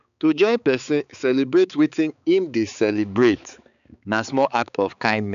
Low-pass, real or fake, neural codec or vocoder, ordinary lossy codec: 7.2 kHz; fake; codec, 16 kHz, 4 kbps, X-Codec, HuBERT features, trained on balanced general audio; none